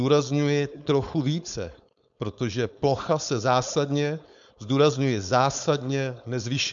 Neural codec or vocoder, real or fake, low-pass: codec, 16 kHz, 4.8 kbps, FACodec; fake; 7.2 kHz